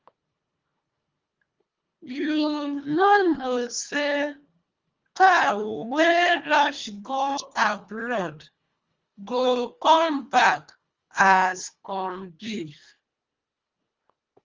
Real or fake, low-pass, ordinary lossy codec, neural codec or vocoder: fake; 7.2 kHz; Opus, 32 kbps; codec, 24 kHz, 1.5 kbps, HILCodec